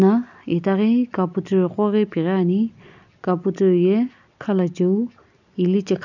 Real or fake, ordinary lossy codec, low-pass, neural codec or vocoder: real; none; 7.2 kHz; none